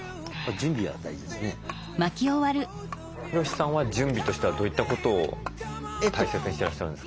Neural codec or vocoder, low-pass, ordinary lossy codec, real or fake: none; none; none; real